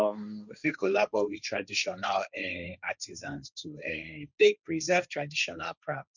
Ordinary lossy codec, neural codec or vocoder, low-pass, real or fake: none; codec, 16 kHz, 1.1 kbps, Voila-Tokenizer; 7.2 kHz; fake